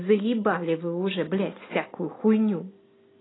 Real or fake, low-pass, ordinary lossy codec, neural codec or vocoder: real; 7.2 kHz; AAC, 16 kbps; none